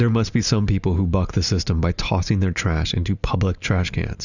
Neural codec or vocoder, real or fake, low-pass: none; real; 7.2 kHz